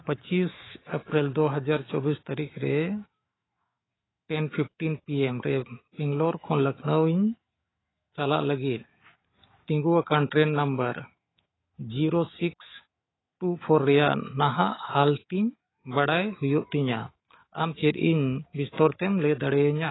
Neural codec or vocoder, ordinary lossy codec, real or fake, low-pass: autoencoder, 48 kHz, 128 numbers a frame, DAC-VAE, trained on Japanese speech; AAC, 16 kbps; fake; 7.2 kHz